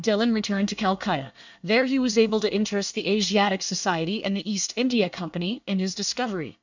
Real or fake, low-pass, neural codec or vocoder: fake; 7.2 kHz; codec, 24 kHz, 1 kbps, SNAC